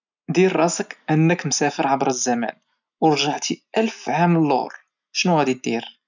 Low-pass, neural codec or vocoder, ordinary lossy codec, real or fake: 7.2 kHz; none; none; real